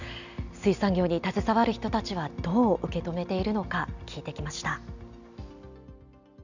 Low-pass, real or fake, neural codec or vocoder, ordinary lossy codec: 7.2 kHz; real; none; none